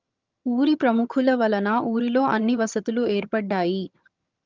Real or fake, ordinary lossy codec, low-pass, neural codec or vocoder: fake; Opus, 24 kbps; 7.2 kHz; vocoder, 22.05 kHz, 80 mel bands, HiFi-GAN